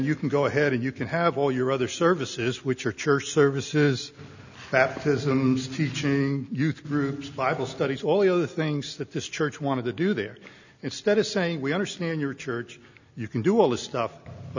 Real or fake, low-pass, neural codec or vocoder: real; 7.2 kHz; none